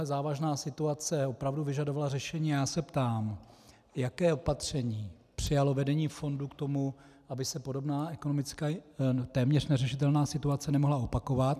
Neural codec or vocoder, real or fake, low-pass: none; real; 14.4 kHz